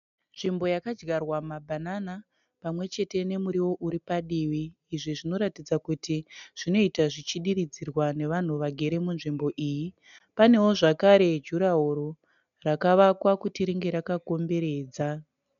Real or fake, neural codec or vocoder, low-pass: real; none; 7.2 kHz